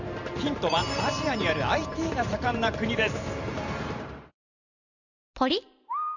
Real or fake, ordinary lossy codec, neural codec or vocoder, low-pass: fake; none; vocoder, 44.1 kHz, 128 mel bands every 512 samples, BigVGAN v2; 7.2 kHz